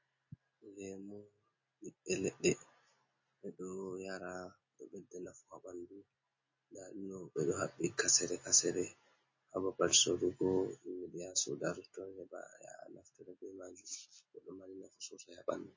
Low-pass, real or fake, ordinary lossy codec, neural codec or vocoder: 7.2 kHz; real; MP3, 32 kbps; none